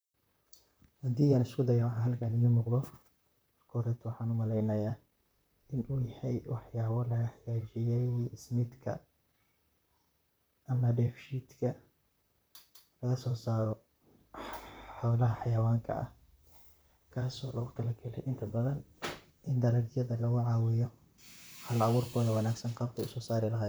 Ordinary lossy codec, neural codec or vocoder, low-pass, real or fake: none; vocoder, 44.1 kHz, 128 mel bands, Pupu-Vocoder; none; fake